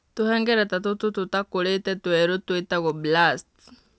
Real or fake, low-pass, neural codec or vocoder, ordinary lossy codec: real; none; none; none